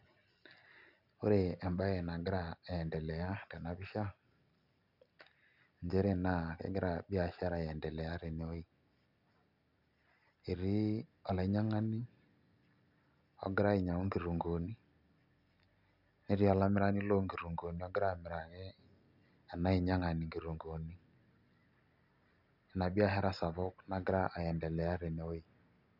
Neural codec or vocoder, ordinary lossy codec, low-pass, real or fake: none; none; 5.4 kHz; real